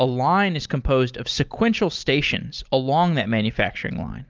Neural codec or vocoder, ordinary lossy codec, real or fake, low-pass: none; Opus, 24 kbps; real; 7.2 kHz